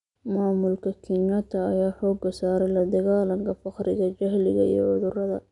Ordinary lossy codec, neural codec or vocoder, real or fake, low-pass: none; none; real; 10.8 kHz